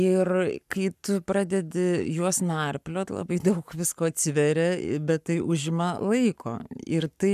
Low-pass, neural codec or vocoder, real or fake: 14.4 kHz; codec, 44.1 kHz, 7.8 kbps, Pupu-Codec; fake